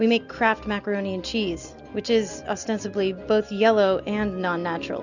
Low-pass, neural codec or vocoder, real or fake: 7.2 kHz; none; real